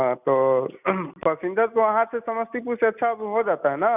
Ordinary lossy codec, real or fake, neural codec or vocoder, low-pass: none; real; none; 3.6 kHz